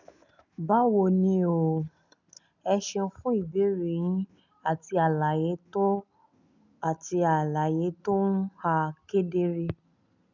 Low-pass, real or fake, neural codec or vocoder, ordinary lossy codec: 7.2 kHz; real; none; none